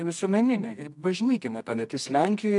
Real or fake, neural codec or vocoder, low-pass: fake; codec, 24 kHz, 0.9 kbps, WavTokenizer, medium music audio release; 10.8 kHz